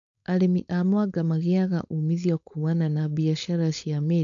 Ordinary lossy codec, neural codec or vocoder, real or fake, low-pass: none; codec, 16 kHz, 4.8 kbps, FACodec; fake; 7.2 kHz